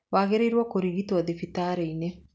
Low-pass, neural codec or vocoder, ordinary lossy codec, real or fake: none; none; none; real